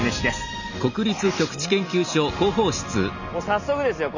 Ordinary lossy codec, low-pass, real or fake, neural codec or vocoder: none; 7.2 kHz; real; none